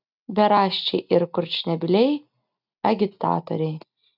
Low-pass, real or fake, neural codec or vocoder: 5.4 kHz; real; none